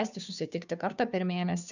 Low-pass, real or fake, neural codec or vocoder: 7.2 kHz; fake; codec, 16 kHz, 2 kbps, FunCodec, trained on Chinese and English, 25 frames a second